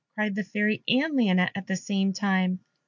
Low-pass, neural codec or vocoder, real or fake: 7.2 kHz; none; real